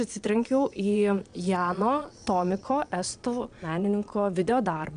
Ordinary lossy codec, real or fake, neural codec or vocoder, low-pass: AAC, 96 kbps; fake; vocoder, 22.05 kHz, 80 mel bands, Vocos; 9.9 kHz